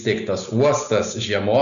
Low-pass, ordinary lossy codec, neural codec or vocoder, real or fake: 7.2 kHz; AAC, 96 kbps; none; real